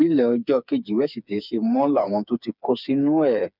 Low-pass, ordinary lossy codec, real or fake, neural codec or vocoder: 5.4 kHz; none; fake; codec, 16 kHz, 4 kbps, FreqCodec, smaller model